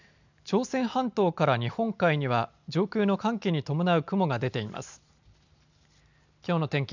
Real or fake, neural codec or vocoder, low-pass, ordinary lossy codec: real; none; 7.2 kHz; none